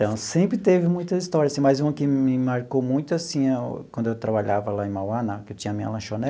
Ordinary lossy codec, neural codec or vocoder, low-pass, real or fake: none; none; none; real